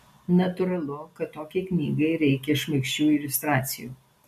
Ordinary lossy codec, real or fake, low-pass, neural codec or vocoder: MP3, 64 kbps; real; 14.4 kHz; none